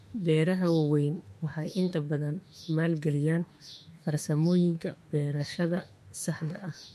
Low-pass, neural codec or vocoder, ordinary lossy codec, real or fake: 14.4 kHz; autoencoder, 48 kHz, 32 numbers a frame, DAC-VAE, trained on Japanese speech; MP3, 64 kbps; fake